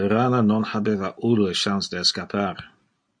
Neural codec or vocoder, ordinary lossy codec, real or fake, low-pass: none; MP3, 48 kbps; real; 9.9 kHz